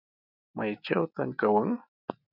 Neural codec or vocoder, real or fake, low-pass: none; real; 5.4 kHz